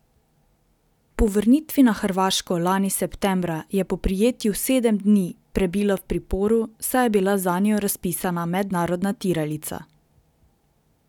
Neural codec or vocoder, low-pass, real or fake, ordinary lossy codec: none; 19.8 kHz; real; none